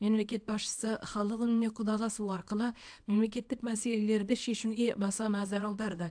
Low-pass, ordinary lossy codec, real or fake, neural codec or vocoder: 9.9 kHz; AAC, 64 kbps; fake; codec, 24 kHz, 0.9 kbps, WavTokenizer, small release